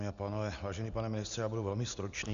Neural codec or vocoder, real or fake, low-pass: none; real; 7.2 kHz